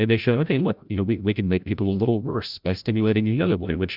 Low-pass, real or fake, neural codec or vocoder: 5.4 kHz; fake; codec, 16 kHz, 0.5 kbps, FreqCodec, larger model